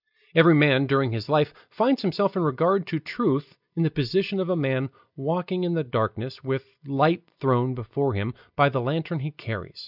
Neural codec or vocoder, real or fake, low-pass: none; real; 5.4 kHz